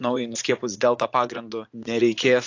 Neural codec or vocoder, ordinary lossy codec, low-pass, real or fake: vocoder, 44.1 kHz, 80 mel bands, Vocos; AAC, 48 kbps; 7.2 kHz; fake